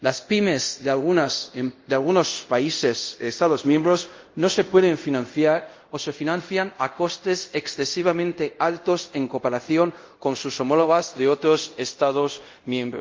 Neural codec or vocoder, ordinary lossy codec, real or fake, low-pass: codec, 24 kHz, 0.5 kbps, DualCodec; Opus, 32 kbps; fake; 7.2 kHz